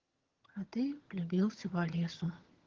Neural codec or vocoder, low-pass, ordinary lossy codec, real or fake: vocoder, 22.05 kHz, 80 mel bands, HiFi-GAN; 7.2 kHz; Opus, 16 kbps; fake